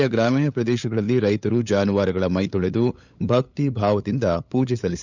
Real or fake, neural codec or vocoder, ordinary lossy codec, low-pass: fake; codec, 16 kHz, 8 kbps, FunCodec, trained on LibriTTS, 25 frames a second; none; 7.2 kHz